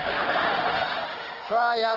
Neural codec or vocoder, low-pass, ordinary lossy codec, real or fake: autoencoder, 48 kHz, 32 numbers a frame, DAC-VAE, trained on Japanese speech; 5.4 kHz; Opus, 16 kbps; fake